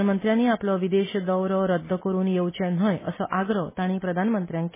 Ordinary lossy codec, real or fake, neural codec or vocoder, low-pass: MP3, 16 kbps; real; none; 3.6 kHz